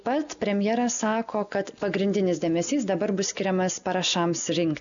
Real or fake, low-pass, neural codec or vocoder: real; 7.2 kHz; none